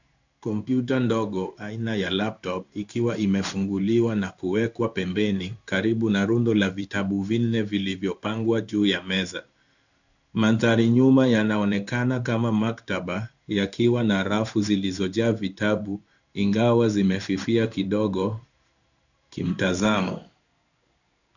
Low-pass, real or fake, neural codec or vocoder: 7.2 kHz; fake; codec, 16 kHz in and 24 kHz out, 1 kbps, XY-Tokenizer